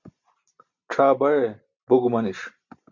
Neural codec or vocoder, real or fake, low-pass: none; real; 7.2 kHz